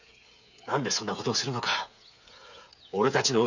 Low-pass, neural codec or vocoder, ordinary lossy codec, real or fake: 7.2 kHz; codec, 16 kHz, 8 kbps, FreqCodec, smaller model; none; fake